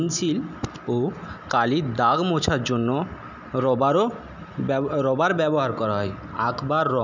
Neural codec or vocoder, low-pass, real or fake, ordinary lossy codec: none; 7.2 kHz; real; none